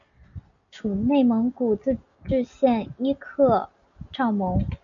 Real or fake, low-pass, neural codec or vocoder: real; 7.2 kHz; none